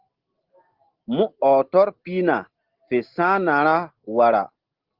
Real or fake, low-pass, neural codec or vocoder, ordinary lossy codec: real; 5.4 kHz; none; Opus, 16 kbps